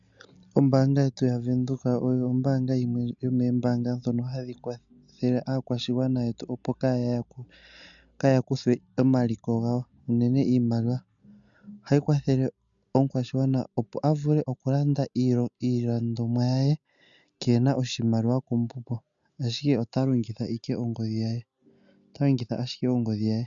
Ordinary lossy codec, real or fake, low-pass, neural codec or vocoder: MP3, 96 kbps; real; 7.2 kHz; none